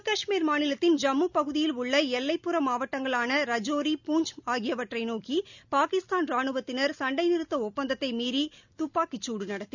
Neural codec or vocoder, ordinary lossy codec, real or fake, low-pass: none; none; real; 7.2 kHz